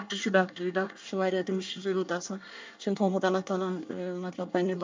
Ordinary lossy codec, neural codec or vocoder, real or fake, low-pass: MP3, 64 kbps; codec, 24 kHz, 1 kbps, SNAC; fake; 7.2 kHz